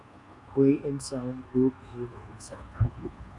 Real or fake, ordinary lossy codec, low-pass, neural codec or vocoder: fake; Opus, 64 kbps; 10.8 kHz; codec, 24 kHz, 1.2 kbps, DualCodec